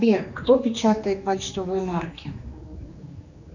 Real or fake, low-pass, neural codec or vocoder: fake; 7.2 kHz; codec, 16 kHz, 2 kbps, X-Codec, HuBERT features, trained on general audio